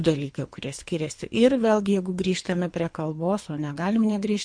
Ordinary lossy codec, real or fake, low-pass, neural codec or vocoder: MP3, 64 kbps; fake; 9.9 kHz; codec, 24 kHz, 3 kbps, HILCodec